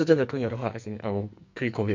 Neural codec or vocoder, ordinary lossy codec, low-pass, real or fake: codec, 44.1 kHz, 2.6 kbps, SNAC; MP3, 64 kbps; 7.2 kHz; fake